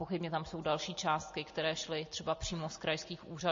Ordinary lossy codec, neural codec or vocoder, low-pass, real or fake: MP3, 32 kbps; none; 7.2 kHz; real